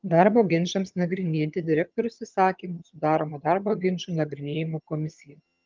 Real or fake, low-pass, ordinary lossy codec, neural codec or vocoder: fake; 7.2 kHz; Opus, 32 kbps; vocoder, 22.05 kHz, 80 mel bands, HiFi-GAN